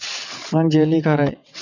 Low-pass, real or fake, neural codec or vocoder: 7.2 kHz; fake; vocoder, 22.05 kHz, 80 mel bands, WaveNeXt